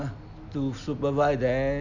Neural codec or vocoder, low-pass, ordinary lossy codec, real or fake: none; 7.2 kHz; none; real